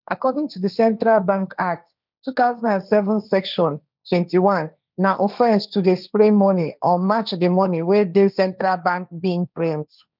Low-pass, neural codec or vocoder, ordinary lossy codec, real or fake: 5.4 kHz; codec, 16 kHz, 1.1 kbps, Voila-Tokenizer; none; fake